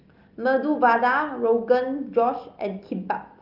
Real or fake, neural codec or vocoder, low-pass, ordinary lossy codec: real; none; 5.4 kHz; Opus, 24 kbps